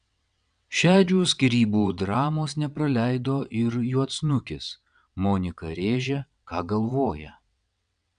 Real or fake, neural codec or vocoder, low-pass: fake; vocoder, 22.05 kHz, 80 mel bands, WaveNeXt; 9.9 kHz